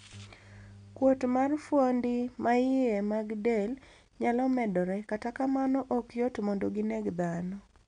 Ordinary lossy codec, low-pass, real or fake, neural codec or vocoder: none; 9.9 kHz; real; none